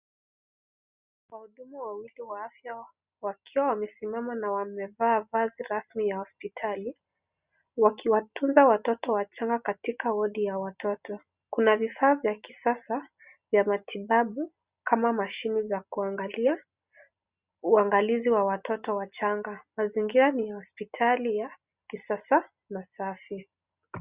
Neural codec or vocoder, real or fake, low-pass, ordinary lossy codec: none; real; 3.6 kHz; Opus, 64 kbps